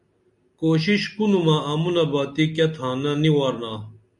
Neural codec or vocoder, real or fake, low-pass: none; real; 10.8 kHz